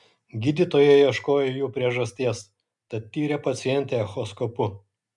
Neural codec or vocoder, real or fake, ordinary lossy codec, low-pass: none; real; MP3, 96 kbps; 10.8 kHz